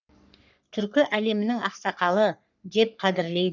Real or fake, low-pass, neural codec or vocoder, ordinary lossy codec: fake; 7.2 kHz; codec, 44.1 kHz, 3.4 kbps, Pupu-Codec; none